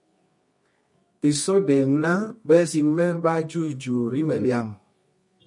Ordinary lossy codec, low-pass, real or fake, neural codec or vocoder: MP3, 48 kbps; 10.8 kHz; fake; codec, 24 kHz, 0.9 kbps, WavTokenizer, medium music audio release